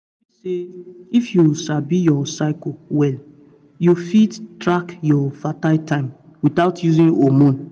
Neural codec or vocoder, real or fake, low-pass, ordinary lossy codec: none; real; 9.9 kHz; none